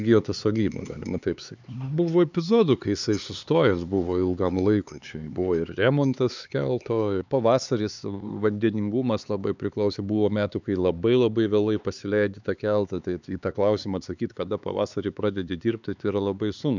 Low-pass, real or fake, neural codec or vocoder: 7.2 kHz; fake; codec, 16 kHz, 4 kbps, X-Codec, HuBERT features, trained on LibriSpeech